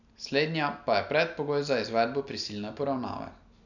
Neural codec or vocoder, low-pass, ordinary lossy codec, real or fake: none; 7.2 kHz; none; real